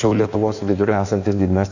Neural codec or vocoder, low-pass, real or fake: codec, 16 kHz in and 24 kHz out, 1.1 kbps, FireRedTTS-2 codec; 7.2 kHz; fake